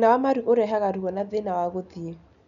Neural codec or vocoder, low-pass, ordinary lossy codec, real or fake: none; 7.2 kHz; none; real